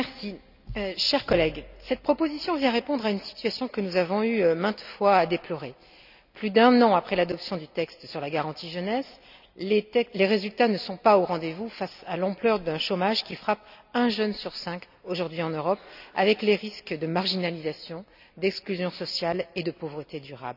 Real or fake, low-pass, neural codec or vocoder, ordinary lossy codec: real; 5.4 kHz; none; none